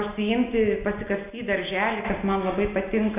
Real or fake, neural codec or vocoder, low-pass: real; none; 3.6 kHz